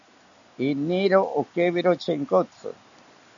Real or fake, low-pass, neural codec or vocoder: real; 7.2 kHz; none